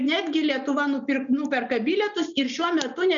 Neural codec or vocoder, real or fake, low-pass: none; real; 7.2 kHz